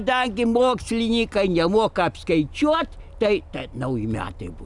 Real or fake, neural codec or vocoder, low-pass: real; none; 10.8 kHz